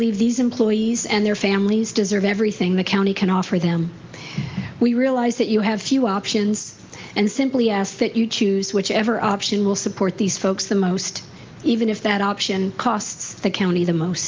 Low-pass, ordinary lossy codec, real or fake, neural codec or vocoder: 7.2 kHz; Opus, 32 kbps; real; none